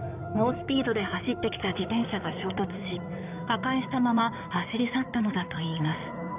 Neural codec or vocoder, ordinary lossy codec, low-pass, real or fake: codec, 16 kHz in and 24 kHz out, 2.2 kbps, FireRedTTS-2 codec; none; 3.6 kHz; fake